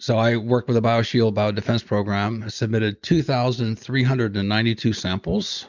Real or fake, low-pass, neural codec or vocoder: real; 7.2 kHz; none